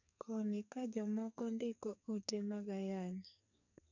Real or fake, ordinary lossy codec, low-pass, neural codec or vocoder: fake; none; 7.2 kHz; codec, 44.1 kHz, 2.6 kbps, SNAC